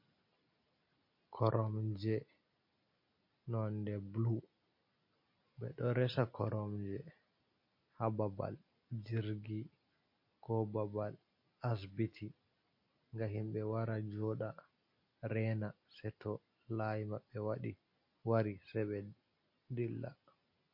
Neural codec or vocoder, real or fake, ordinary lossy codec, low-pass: none; real; MP3, 32 kbps; 5.4 kHz